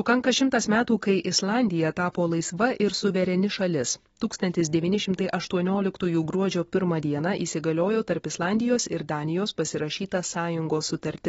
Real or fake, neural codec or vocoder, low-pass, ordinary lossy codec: real; none; 19.8 kHz; AAC, 24 kbps